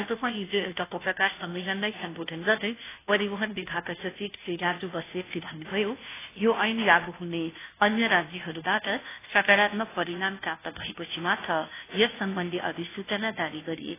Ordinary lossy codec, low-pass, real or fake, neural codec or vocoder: AAC, 16 kbps; 3.6 kHz; fake; codec, 16 kHz, 0.5 kbps, FunCodec, trained on Chinese and English, 25 frames a second